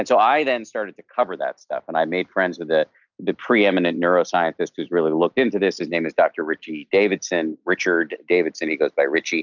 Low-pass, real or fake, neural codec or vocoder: 7.2 kHz; real; none